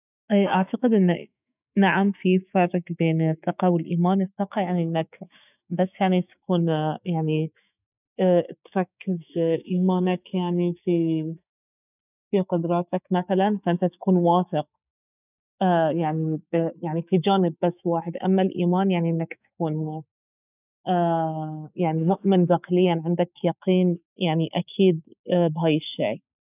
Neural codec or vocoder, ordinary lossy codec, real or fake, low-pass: autoencoder, 48 kHz, 128 numbers a frame, DAC-VAE, trained on Japanese speech; none; fake; 3.6 kHz